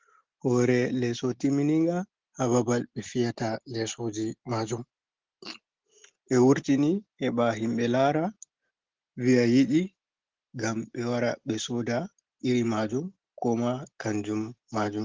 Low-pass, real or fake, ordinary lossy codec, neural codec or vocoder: 7.2 kHz; real; Opus, 16 kbps; none